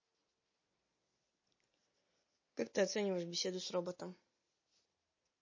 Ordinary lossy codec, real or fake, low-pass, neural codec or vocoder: MP3, 32 kbps; real; 7.2 kHz; none